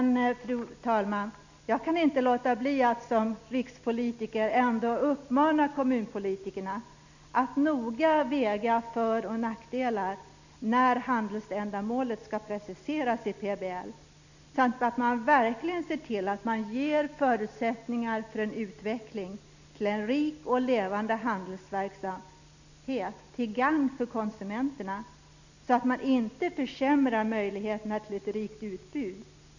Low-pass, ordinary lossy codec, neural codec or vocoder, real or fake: 7.2 kHz; none; none; real